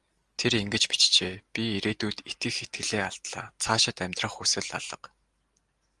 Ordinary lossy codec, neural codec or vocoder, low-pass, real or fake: Opus, 24 kbps; none; 10.8 kHz; real